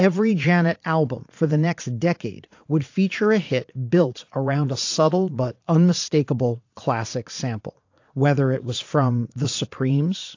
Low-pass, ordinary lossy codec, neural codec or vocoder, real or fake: 7.2 kHz; AAC, 48 kbps; vocoder, 44.1 kHz, 80 mel bands, Vocos; fake